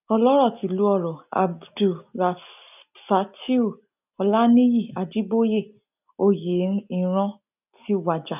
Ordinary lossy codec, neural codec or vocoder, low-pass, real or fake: none; none; 3.6 kHz; real